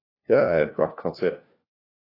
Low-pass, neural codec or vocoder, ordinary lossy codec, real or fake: 5.4 kHz; codec, 16 kHz, 1 kbps, FunCodec, trained on LibriTTS, 50 frames a second; AAC, 32 kbps; fake